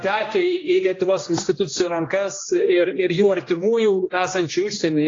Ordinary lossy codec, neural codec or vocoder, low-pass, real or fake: AAC, 32 kbps; codec, 16 kHz, 1 kbps, X-Codec, HuBERT features, trained on general audio; 7.2 kHz; fake